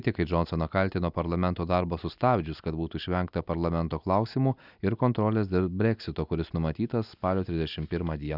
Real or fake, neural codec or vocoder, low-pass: real; none; 5.4 kHz